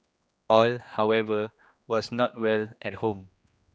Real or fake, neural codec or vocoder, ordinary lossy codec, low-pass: fake; codec, 16 kHz, 2 kbps, X-Codec, HuBERT features, trained on general audio; none; none